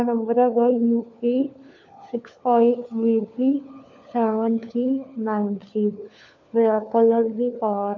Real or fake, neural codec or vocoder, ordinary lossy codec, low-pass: fake; codec, 16 kHz, 4 kbps, FunCodec, trained on LibriTTS, 50 frames a second; MP3, 48 kbps; 7.2 kHz